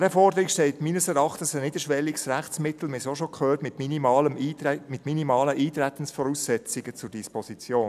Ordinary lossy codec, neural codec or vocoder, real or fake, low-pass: AAC, 96 kbps; none; real; 14.4 kHz